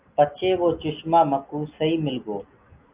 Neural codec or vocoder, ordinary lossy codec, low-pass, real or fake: none; Opus, 24 kbps; 3.6 kHz; real